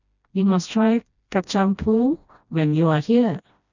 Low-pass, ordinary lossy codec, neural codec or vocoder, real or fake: 7.2 kHz; none; codec, 16 kHz, 1 kbps, FreqCodec, smaller model; fake